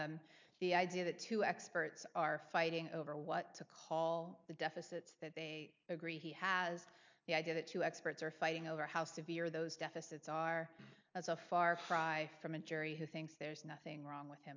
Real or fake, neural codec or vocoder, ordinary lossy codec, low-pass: real; none; MP3, 64 kbps; 7.2 kHz